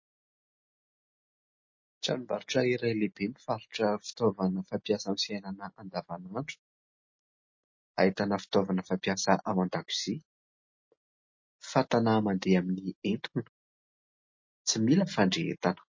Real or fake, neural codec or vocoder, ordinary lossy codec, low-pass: real; none; MP3, 32 kbps; 7.2 kHz